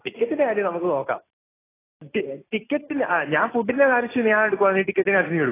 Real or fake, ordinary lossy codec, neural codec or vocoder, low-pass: real; AAC, 16 kbps; none; 3.6 kHz